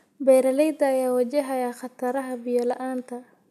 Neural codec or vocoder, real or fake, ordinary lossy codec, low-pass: none; real; none; 14.4 kHz